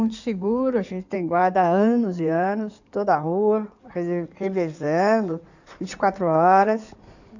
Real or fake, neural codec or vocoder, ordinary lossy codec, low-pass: fake; codec, 16 kHz in and 24 kHz out, 2.2 kbps, FireRedTTS-2 codec; none; 7.2 kHz